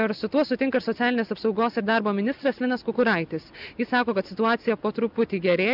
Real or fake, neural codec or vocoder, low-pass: real; none; 5.4 kHz